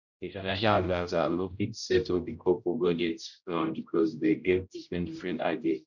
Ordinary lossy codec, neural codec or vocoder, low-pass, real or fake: none; codec, 16 kHz, 0.5 kbps, X-Codec, HuBERT features, trained on general audio; 7.2 kHz; fake